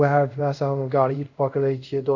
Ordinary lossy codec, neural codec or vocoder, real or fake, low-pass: none; codec, 24 kHz, 0.5 kbps, DualCodec; fake; 7.2 kHz